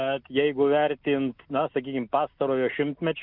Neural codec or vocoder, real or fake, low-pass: none; real; 5.4 kHz